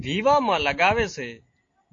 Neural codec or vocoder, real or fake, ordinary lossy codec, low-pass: none; real; AAC, 48 kbps; 7.2 kHz